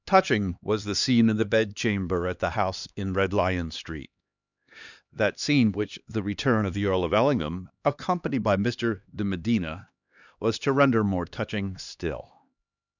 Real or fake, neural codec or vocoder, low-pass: fake; codec, 16 kHz, 2 kbps, X-Codec, HuBERT features, trained on LibriSpeech; 7.2 kHz